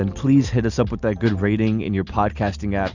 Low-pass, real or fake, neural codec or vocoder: 7.2 kHz; real; none